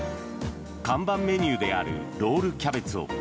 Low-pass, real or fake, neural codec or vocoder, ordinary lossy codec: none; real; none; none